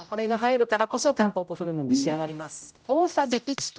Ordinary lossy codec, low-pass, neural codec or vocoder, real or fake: none; none; codec, 16 kHz, 0.5 kbps, X-Codec, HuBERT features, trained on general audio; fake